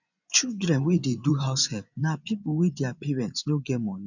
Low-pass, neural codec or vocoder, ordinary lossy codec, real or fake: 7.2 kHz; vocoder, 24 kHz, 100 mel bands, Vocos; none; fake